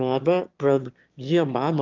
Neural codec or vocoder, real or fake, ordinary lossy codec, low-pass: autoencoder, 22.05 kHz, a latent of 192 numbers a frame, VITS, trained on one speaker; fake; Opus, 24 kbps; 7.2 kHz